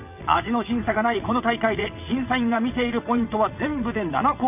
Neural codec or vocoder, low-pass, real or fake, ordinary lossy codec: vocoder, 22.05 kHz, 80 mel bands, WaveNeXt; 3.6 kHz; fake; none